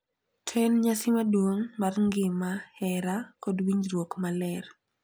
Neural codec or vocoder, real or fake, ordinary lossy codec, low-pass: none; real; none; none